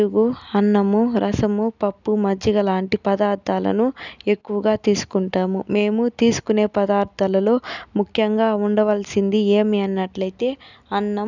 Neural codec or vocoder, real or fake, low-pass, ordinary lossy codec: none; real; 7.2 kHz; none